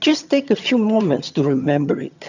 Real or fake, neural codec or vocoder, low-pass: fake; vocoder, 22.05 kHz, 80 mel bands, HiFi-GAN; 7.2 kHz